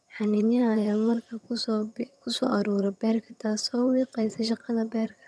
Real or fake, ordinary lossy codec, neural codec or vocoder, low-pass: fake; none; vocoder, 22.05 kHz, 80 mel bands, HiFi-GAN; none